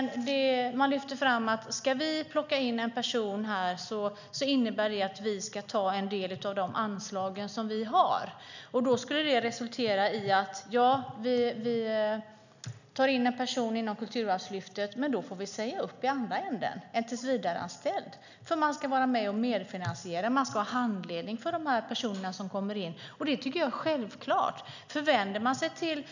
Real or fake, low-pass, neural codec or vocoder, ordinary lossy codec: real; 7.2 kHz; none; none